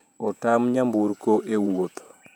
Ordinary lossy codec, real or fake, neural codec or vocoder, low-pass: none; fake; vocoder, 48 kHz, 128 mel bands, Vocos; 19.8 kHz